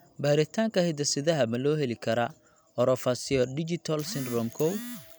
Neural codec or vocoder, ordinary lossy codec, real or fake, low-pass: none; none; real; none